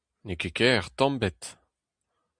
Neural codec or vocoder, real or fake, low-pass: none; real; 9.9 kHz